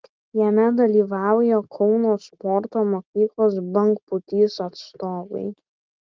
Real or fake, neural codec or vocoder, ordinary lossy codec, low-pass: real; none; Opus, 32 kbps; 7.2 kHz